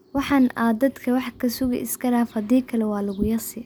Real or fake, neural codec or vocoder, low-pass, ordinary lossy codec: real; none; none; none